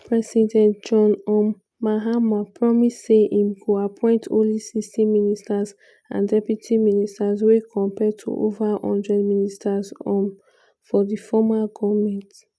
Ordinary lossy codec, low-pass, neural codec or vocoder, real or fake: none; none; none; real